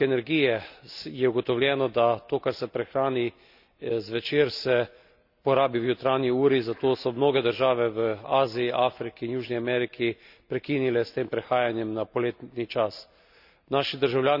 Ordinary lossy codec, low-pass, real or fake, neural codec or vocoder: none; 5.4 kHz; real; none